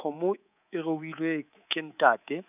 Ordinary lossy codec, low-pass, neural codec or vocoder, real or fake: none; 3.6 kHz; codec, 24 kHz, 3.1 kbps, DualCodec; fake